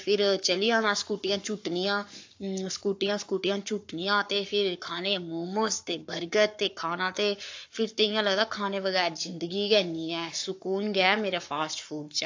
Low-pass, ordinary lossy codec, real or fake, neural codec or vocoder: 7.2 kHz; AAC, 48 kbps; fake; codec, 44.1 kHz, 7.8 kbps, Pupu-Codec